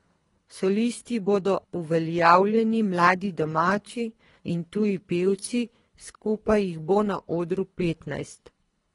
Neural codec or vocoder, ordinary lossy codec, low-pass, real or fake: codec, 24 kHz, 3 kbps, HILCodec; AAC, 32 kbps; 10.8 kHz; fake